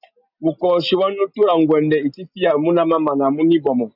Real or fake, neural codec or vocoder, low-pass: real; none; 5.4 kHz